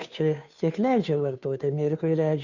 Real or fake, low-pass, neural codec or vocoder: fake; 7.2 kHz; codec, 16 kHz, 2 kbps, FunCodec, trained on Chinese and English, 25 frames a second